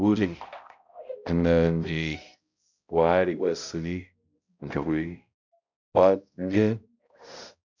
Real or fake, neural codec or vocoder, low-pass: fake; codec, 16 kHz, 0.5 kbps, X-Codec, HuBERT features, trained on balanced general audio; 7.2 kHz